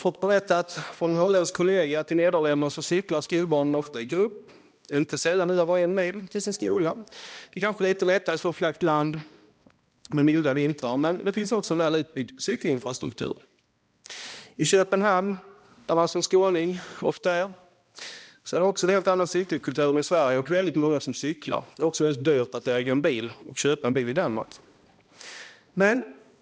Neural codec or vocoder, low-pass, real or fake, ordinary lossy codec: codec, 16 kHz, 1 kbps, X-Codec, HuBERT features, trained on balanced general audio; none; fake; none